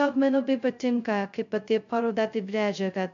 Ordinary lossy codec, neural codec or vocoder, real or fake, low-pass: MP3, 64 kbps; codec, 16 kHz, 0.2 kbps, FocalCodec; fake; 7.2 kHz